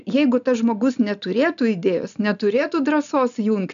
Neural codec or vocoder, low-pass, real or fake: none; 7.2 kHz; real